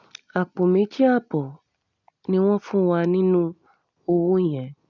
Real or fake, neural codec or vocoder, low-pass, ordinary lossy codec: real; none; 7.2 kHz; none